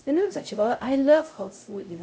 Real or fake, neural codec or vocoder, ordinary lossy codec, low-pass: fake; codec, 16 kHz, 0.5 kbps, X-Codec, HuBERT features, trained on LibriSpeech; none; none